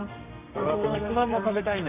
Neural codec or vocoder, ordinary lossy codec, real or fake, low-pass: codec, 32 kHz, 1.9 kbps, SNAC; none; fake; 3.6 kHz